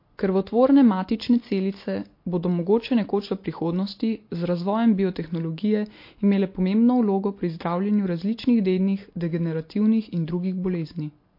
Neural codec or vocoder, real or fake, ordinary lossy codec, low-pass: none; real; MP3, 32 kbps; 5.4 kHz